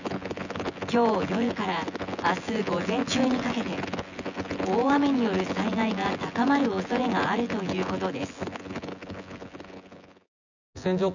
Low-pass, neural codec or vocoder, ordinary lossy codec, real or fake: 7.2 kHz; vocoder, 24 kHz, 100 mel bands, Vocos; none; fake